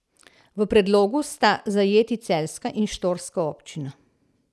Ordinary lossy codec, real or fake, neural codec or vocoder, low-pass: none; real; none; none